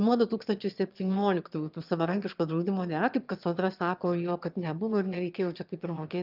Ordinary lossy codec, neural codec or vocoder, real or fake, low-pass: Opus, 24 kbps; autoencoder, 22.05 kHz, a latent of 192 numbers a frame, VITS, trained on one speaker; fake; 5.4 kHz